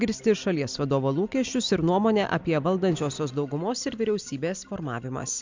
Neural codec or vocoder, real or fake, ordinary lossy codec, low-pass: none; real; MP3, 64 kbps; 7.2 kHz